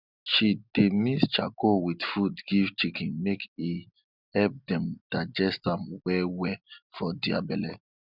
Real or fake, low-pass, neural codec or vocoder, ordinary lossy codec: real; 5.4 kHz; none; none